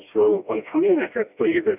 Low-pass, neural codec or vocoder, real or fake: 3.6 kHz; codec, 16 kHz, 1 kbps, FreqCodec, smaller model; fake